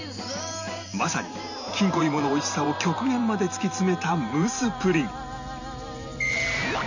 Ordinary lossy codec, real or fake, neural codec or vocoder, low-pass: none; fake; vocoder, 44.1 kHz, 128 mel bands every 512 samples, BigVGAN v2; 7.2 kHz